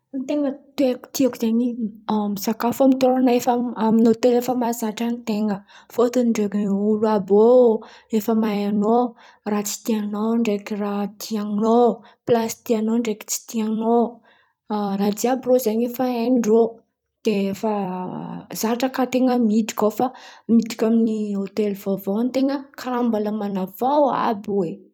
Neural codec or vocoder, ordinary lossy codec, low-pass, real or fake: vocoder, 44.1 kHz, 128 mel bands every 256 samples, BigVGAN v2; none; 19.8 kHz; fake